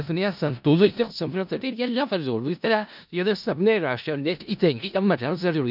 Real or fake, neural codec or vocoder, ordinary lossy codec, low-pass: fake; codec, 16 kHz in and 24 kHz out, 0.4 kbps, LongCat-Audio-Codec, four codebook decoder; none; 5.4 kHz